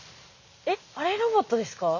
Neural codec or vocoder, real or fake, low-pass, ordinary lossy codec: none; real; 7.2 kHz; none